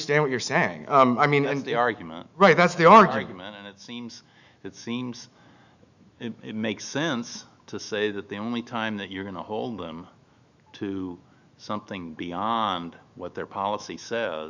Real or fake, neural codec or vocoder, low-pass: fake; autoencoder, 48 kHz, 128 numbers a frame, DAC-VAE, trained on Japanese speech; 7.2 kHz